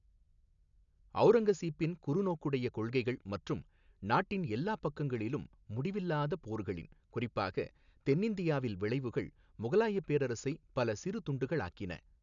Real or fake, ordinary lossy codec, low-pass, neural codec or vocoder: real; none; 7.2 kHz; none